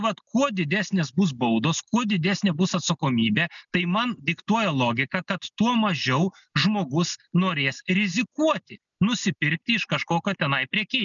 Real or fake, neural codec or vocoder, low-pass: real; none; 7.2 kHz